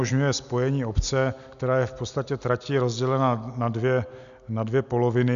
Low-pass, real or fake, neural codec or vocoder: 7.2 kHz; real; none